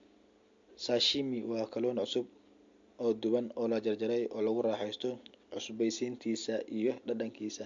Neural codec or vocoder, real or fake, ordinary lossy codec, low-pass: none; real; MP3, 48 kbps; 7.2 kHz